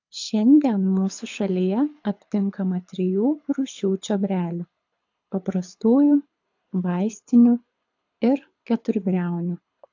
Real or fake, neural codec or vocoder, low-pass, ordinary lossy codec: fake; codec, 24 kHz, 6 kbps, HILCodec; 7.2 kHz; AAC, 48 kbps